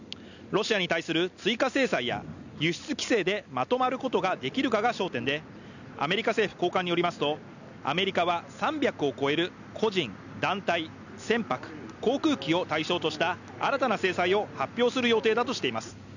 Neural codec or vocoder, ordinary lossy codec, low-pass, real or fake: none; none; 7.2 kHz; real